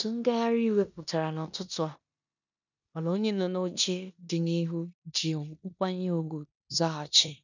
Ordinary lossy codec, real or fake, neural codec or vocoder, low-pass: none; fake; codec, 16 kHz in and 24 kHz out, 0.9 kbps, LongCat-Audio-Codec, four codebook decoder; 7.2 kHz